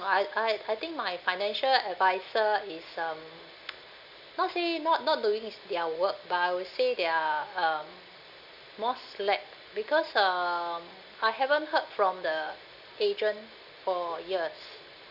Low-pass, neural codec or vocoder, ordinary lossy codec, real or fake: 5.4 kHz; none; none; real